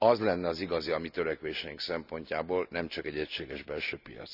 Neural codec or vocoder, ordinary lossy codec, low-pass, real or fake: none; none; 5.4 kHz; real